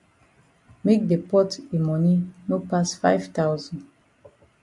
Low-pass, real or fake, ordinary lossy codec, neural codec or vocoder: 10.8 kHz; real; AAC, 64 kbps; none